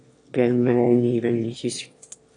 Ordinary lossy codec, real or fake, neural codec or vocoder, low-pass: AAC, 48 kbps; fake; autoencoder, 22.05 kHz, a latent of 192 numbers a frame, VITS, trained on one speaker; 9.9 kHz